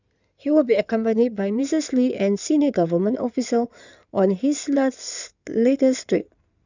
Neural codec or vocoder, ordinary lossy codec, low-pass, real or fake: codec, 16 kHz in and 24 kHz out, 2.2 kbps, FireRedTTS-2 codec; none; 7.2 kHz; fake